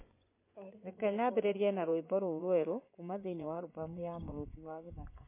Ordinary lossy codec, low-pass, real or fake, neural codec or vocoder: MP3, 24 kbps; 3.6 kHz; fake; vocoder, 24 kHz, 100 mel bands, Vocos